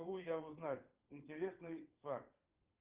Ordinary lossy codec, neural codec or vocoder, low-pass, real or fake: Opus, 32 kbps; vocoder, 22.05 kHz, 80 mel bands, WaveNeXt; 3.6 kHz; fake